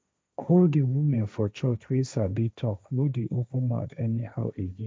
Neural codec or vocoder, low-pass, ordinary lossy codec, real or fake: codec, 16 kHz, 1.1 kbps, Voila-Tokenizer; none; none; fake